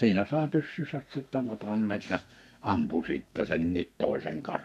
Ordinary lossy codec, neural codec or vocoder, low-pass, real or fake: none; codec, 32 kHz, 1.9 kbps, SNAC; 14.4 kHz; fake